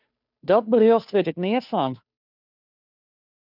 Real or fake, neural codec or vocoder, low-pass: fake; codec, 16 kHz, 2 kbps, FunCodec, trained on Chinese and English, 25 frames a second; 5.4 kHz